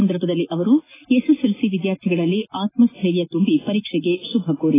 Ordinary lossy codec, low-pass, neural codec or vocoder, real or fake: AAC, 16 kbps; 3.6 kHz; none; real